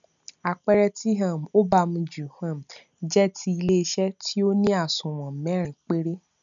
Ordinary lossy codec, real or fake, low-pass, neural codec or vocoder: none; real; 7.2 kHz; none